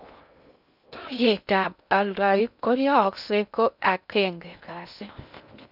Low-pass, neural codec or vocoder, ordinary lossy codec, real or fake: 5.4 kHz; codec, 16 kHz in and 24 kHz out, 0.6 kbps, FocalCodec, streaming, 2048 codes; none; fake